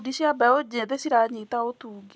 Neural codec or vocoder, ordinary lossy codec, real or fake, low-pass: none; none; real; none